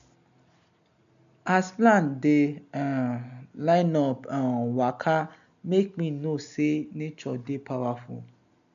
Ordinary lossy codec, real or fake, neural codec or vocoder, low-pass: none; real; none; 7.2 kHz